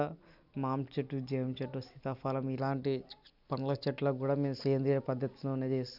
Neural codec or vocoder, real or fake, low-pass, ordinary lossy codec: none; real; 5.4 kHz; none